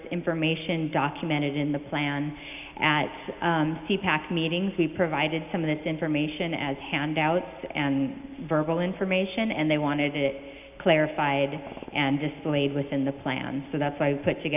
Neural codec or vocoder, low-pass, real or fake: none; 3.6 kHz; real